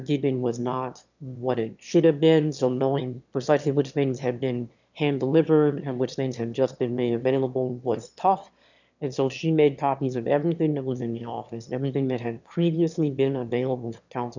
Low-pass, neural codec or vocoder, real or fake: 7.2 kHz; autoencoder, 22.05 kHz, a latent of 192 numbers a frame, VITS, trained on one speaker; fake